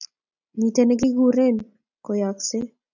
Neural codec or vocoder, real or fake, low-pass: none; real; 7.2 kHz